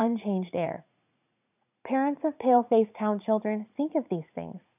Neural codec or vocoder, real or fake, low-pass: vocoder, 44.1 kHz, 80 mel bands, Vocos; fake; 3.6 kHz